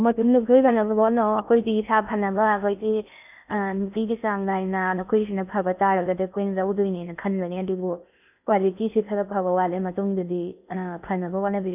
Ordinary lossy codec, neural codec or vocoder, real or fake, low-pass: none; codec, 16 kHz in and 24 kHz out, 0.6 kbps, FocalCodec, streaming, 2048 codes; fake; 3.6 kHz